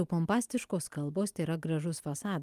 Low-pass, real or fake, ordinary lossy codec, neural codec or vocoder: 14.4 kHz; real; Opus, 32 kbps; none